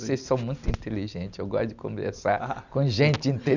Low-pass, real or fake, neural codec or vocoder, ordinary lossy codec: 7.2 kHz; real; none; none